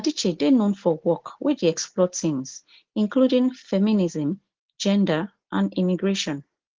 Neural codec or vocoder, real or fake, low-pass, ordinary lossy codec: none; real; 7.2 kHz; Opus, 16 kbps